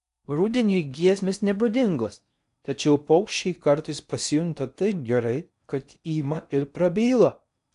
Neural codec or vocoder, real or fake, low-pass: codec, 16 kHz in and 24 kHz out, 0.6 kbps, FocalCodec, streaming, 4096 codes; fake; 10.8 kHz